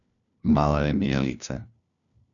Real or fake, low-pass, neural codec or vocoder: fake; 7.2 kHz; codec, 16 kHz, 1 kbps, FunCodec, trained on LibriTTS, 50 frames a second